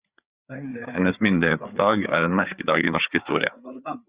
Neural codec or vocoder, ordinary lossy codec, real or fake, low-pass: codec, 16 kHz, 4 kbps, FreqCodec, larger model; Opus, 64 kbps; fake; 3.6 kHz